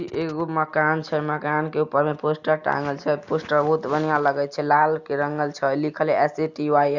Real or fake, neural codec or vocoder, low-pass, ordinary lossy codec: real; none; 7.2 kHz; Opus, 64 kbps